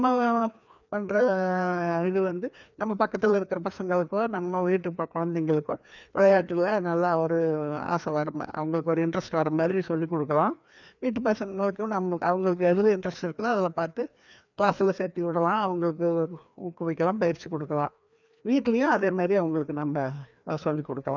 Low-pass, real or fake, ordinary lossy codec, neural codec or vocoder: 7.2 kHz; fake; none; codec, 16 kHz, 2 kbps, FreqCodec, larger model